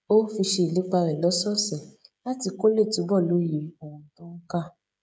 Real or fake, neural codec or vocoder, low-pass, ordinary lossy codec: fake; codec, 16 kHz, 16 kbps, FreqCodec, smaller model; none; none